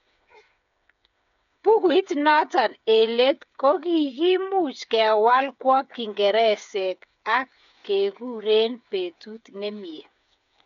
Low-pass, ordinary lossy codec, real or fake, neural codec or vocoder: 7.2 kHz; none; fake; codec, 16 kHz, 8 kbps, FreqCodec, smaller model